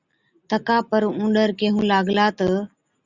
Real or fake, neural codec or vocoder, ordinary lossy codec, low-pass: real; none; Opus, 64 kbps; 7.2 kHz